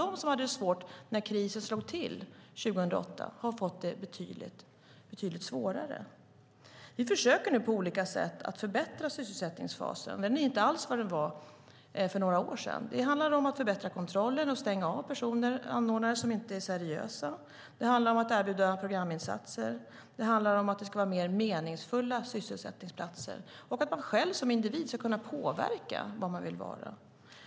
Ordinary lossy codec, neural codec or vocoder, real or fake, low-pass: none; none; real; none